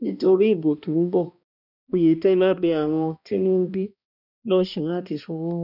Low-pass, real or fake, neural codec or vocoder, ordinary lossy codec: 5.4 kHz; fake; codec, 16 kHz, 1 kbps, X-Codec, HuBERT features, trained on balanced general audio; none